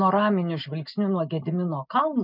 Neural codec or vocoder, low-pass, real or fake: none; 5.4 kHz; real